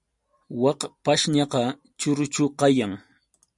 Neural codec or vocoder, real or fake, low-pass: none; real; 10.8 kHz